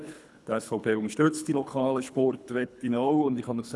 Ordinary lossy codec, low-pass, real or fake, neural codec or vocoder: none; none; fake; codec, 24 kHz, 3 kbps, HILCodec